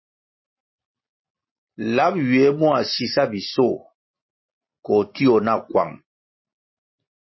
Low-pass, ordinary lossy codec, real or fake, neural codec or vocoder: 7.2 kHz; MP3, 24 kbps; real; none